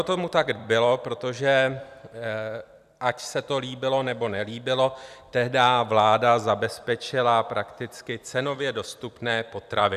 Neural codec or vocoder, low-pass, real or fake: vocoder, 44.1 kHz, 128 mel bands every 256 samples, BigVGAN v2; 14.4 kHz; fake